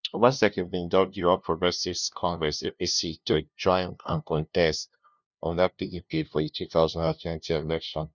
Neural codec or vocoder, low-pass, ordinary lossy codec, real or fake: codec, 16 kHz, 0.5 kbps, FunCodec, trained on LibriTTS, 25 frames a second; 7.2 kHz; none; fake